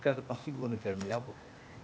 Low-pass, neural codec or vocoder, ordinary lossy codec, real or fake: none; codec, 16 kHz, 0.8 kbps, ZipCodec; none; fake